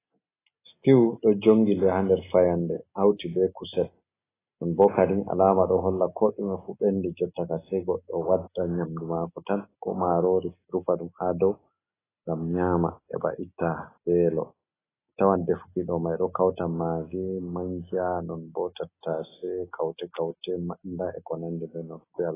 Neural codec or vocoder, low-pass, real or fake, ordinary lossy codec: none; 3.6 kHz; real; AAC, 16 kbps